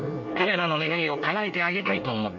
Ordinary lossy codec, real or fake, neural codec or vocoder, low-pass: MP3, 48 kbps; fake; codec, 24 kHz, 1 kbps, SNAC; 7.2 kHz